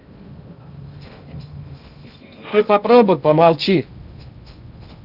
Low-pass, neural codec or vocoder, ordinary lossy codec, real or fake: 5.4 kHz; codec, 16 kHz in and 24 kHz out, 0.6 kbps, FocalCodec, streaming, 2048 codes; none; fake